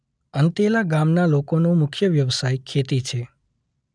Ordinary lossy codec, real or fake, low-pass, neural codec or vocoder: none; real; 9.9 kHz; none